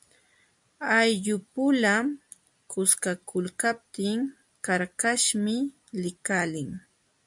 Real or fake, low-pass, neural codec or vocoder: real; 10.8 kHz; none